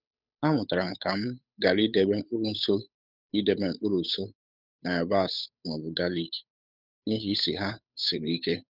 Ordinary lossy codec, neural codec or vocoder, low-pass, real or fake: none; codec, 16 kHz, 8 kbps, FunCodec, trained on Chinese and English, 25 frames a second; 5.4 kHz; fake